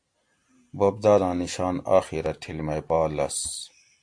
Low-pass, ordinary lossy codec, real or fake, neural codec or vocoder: 9.9 kHz; AAC, 64 kbps; real; none